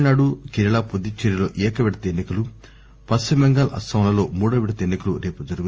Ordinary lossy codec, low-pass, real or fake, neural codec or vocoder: Opus, 24 kbps; 7.2 kHz; real; none